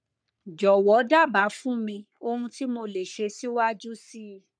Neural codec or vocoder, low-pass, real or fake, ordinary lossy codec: codec, 44.1 kHz, 3.4 kbps, Pupu-Codec; 9.9 kHz; fake; none